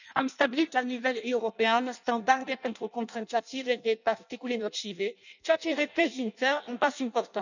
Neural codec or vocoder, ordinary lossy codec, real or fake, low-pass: codec, 16 kHz in and 24 kHz out, 0.6 kbps, FireRedTTS-2 codec; none; fake; 7.2 kHz